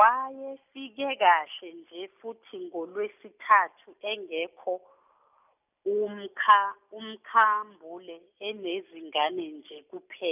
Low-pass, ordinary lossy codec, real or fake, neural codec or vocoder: 3.6 kHz; none; real; none